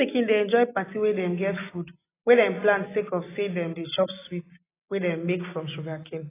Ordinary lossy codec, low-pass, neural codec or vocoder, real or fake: AAC, 16 kbps; 3.6 kHz; none; real